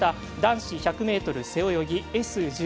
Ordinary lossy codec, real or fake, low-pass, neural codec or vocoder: none; real; none; none